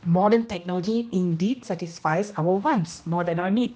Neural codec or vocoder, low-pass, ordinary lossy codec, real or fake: codec, 16 kHz, 1 kbps, X-Codec, HuBERT features, trained on general audio; none; none; fake